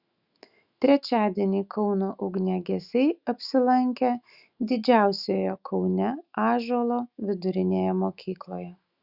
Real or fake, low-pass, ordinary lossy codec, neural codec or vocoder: fake; 5.4 kHz; Opus, 64 kbps; autoencoder, 48 kHz, 128 numbers a frame, DAC-VAE, trained on Japanese speech